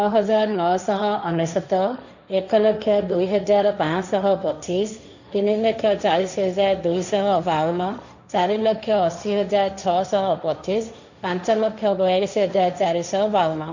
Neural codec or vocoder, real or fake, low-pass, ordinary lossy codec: codec, 16 kHz, 1.1 kbps, Voila-Tokenizer; fake; 7.2 kHz; none